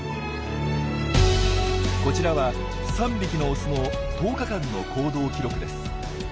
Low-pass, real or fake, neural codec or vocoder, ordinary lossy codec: none; real; none; none